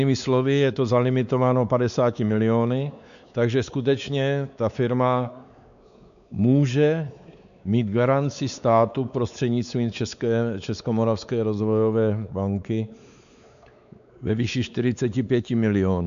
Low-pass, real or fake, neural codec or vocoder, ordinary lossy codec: 7.2 kHz; fake; codec, 16 kHz, 4 kbps, X-Codec, WavLM features, trained on Multilingual LibriSpeech; MP3, 96 kbps